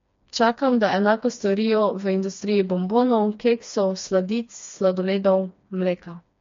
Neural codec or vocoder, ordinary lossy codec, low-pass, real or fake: codec, 16 kHz, 2 kbps, FreqCodec, smaller model; MP3, 48 kbps; 7.2 kHz; fake